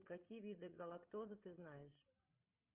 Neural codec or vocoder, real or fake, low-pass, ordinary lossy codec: codec, 16 kHz, 16 kbps, FreqCodec, larger model; fake; 3.6 kHz; Opus, 32 kbps